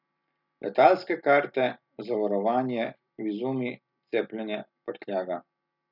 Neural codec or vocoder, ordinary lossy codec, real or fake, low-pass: none; none; real; 5.4 kHz